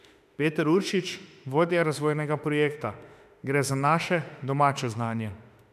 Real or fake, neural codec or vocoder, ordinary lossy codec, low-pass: fake; autoencoder, 48 kHz, 32 numbers a frame, DAC-VAE, trained on Japanese speech; none; 14.4 kHz